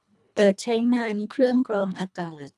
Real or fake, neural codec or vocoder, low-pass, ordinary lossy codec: fake; codec, 24 kHz, 1.5 kbps, HILCodec; none; none